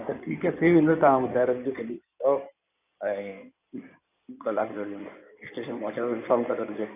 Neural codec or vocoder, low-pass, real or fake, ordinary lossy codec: codec, 16 kHz in and 24 kHz out, 2.2 kbps, FireRedTTS-2 codec; 3.6 kHz; fake; none